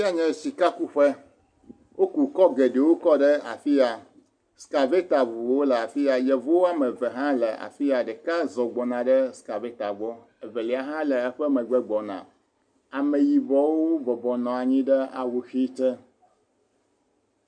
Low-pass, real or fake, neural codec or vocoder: 9.9 kHz; real; none